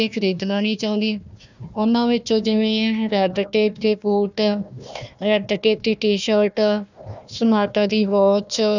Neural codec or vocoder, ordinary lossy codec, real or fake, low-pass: codec, 16 kHz, 1 kbps, FunCodec, trained on Chinese and English, 50 frames a second; none; fake; 7.2 kHz